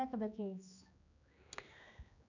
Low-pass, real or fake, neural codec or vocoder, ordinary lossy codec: 7.2 kHz; fake; codec, 16 kHz, 2 kbps, X-Codec, HuBERT features, trained on general audio; none